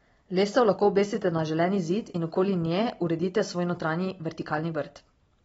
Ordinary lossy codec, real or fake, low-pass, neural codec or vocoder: AAC, 24 kbps; real; 9.9 kHz; none